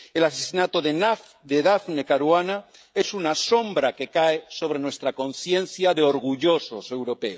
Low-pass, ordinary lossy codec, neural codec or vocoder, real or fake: none; none; codec, 16 kHz, 16 kbps, FreqCodec, smaller model; fake